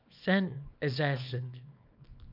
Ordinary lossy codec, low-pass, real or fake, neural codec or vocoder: MP3, 48 kbps; 5.4 kHz; fake; codec, 24 kHz, 0.9 kbps, WavTokenizer, small release